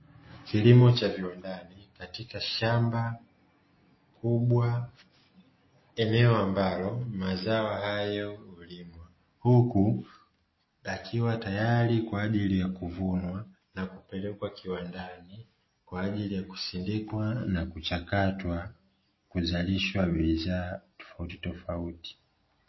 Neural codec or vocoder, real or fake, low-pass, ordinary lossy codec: none; real; 7.2 kHz; MP3, 24 kbps